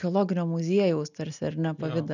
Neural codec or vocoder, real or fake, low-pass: none; real; 7.2 kHz